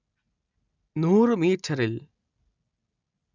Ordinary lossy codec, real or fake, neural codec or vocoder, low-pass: none; real; none; 7.2 kHz